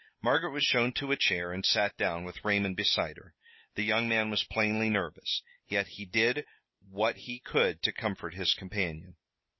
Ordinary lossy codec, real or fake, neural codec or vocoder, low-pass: MP3, 24 kbps; real; none; 7.2 kHz